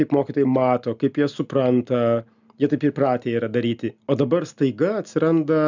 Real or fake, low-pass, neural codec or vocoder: real; 7.2 kHz; none